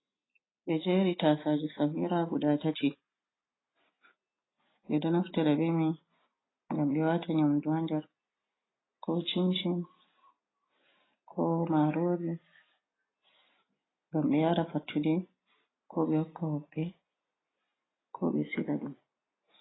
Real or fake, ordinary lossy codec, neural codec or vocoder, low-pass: real; AAC, 16 kbps; none; 7.2 kHz